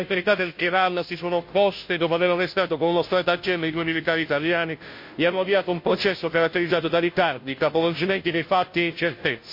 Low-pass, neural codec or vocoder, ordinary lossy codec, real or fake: 5.4 kHz; codec, 16 kHz, 0.5 kbps, FunCodec, trained on Chinese and English, 25 frames a second; MP3, 32 kbps; fake